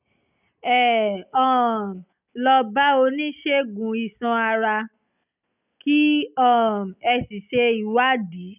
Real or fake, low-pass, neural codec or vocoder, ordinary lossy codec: real; 3.6 kHz; none; none